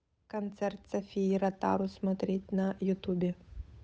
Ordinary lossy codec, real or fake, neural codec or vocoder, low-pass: none; fake; codec, 16 kHz, 8 kbps, FunCodec, trained on Chinese and English, 25 frames a second; none